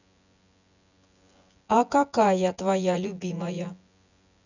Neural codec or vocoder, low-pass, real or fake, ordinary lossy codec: vocoder, 24 kHz, 100 mel bands, Vocos; 7.2 kHz; fake; none